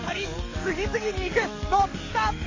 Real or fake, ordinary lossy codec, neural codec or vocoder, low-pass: fake; MP3, 32 kbps; codec, 44.1 kHz, 7.8 kbps, DAC; 7.2 kHz